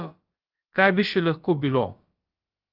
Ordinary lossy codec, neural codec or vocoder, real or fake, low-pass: Opus, 32 kbps; codec, 16 kHz, about 1 kbps, DyCAST, with the encoder's durations; fake; 5.4 kHz